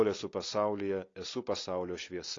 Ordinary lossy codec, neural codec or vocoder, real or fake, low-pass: AAC, 32 kbps; none; real; 7.2 kHz